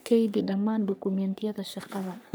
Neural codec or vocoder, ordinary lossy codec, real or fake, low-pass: codec, 44.1 kHz, 3.4 kbps, Pupu-Codec; none; fake; none